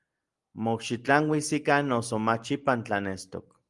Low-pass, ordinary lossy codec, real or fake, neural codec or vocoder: 10.8 kHz; Opus, 32 kbps; real; none